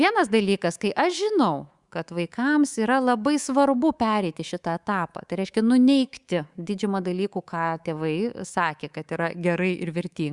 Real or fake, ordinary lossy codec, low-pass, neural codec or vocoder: fake; Opus, 64 kbps; 10.8 kHz; codec, 24 kHz, 3.1 kbps, DualCodec